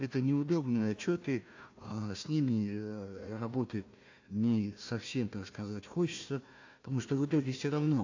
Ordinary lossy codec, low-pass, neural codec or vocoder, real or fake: AAC, 48 kbps; 7.2 kHz; codec, 16 kHz, 1 kbps, FunCodec, trained on Chinese and English, 50 frames a second; fake